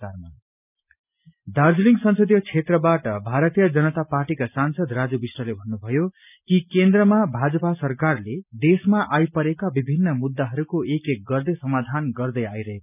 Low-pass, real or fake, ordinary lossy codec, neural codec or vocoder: 3.6 kHz; real; none; none